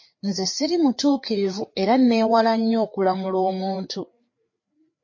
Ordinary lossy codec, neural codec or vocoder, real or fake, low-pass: MP3, 32 kbps; codec, 16 kHz, 4 kbps, FreqCodec, larger model; fake; 7.2 kHz